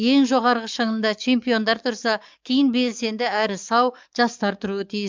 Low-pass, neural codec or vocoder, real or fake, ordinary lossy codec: 7.2 kHz; codec, 44.1 kHz, 7.8 kbps, DAC; fake; MP3, 64 kbps